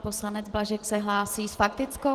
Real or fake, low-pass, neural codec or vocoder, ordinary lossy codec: real; 14.4 kHz; none; Opus, 16 kbps